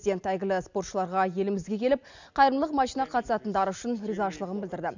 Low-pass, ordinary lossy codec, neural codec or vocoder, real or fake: 7.2 kHz; none; none; real